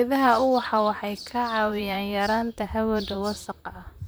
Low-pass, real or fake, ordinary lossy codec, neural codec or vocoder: none; fake; none; vocoder, 44.1 kHz, 128 mel bands, Pupu-Vocoder